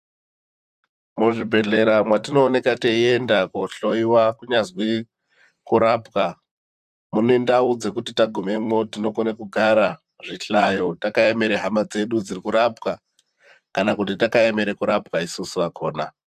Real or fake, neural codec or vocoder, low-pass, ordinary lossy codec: fake; vocoder, 44.1 kHz, 128 mel bands, Pupu-Vocoder; 14.4 kHz; AAC, 96 kbps